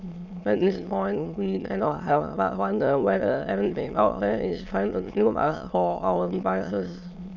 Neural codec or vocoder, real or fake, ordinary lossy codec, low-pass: autoencoder, 22.05 kHz, a latent of 192 numbers a frame, VITS, trained on many speakers; fake; none; 7.2 kHz